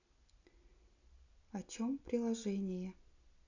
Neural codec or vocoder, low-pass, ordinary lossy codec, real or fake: none; 7.2 kHz; none; real